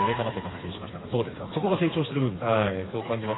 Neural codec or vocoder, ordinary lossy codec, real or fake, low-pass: codec, 16 kHz, 4 kbps, FreqCodec, smaller model; AAC, 16 kbps; fake; 7.2 kHz